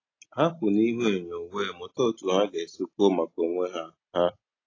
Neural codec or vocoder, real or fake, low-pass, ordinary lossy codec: none; real; 7.2 kHz; AAC, 32 kbps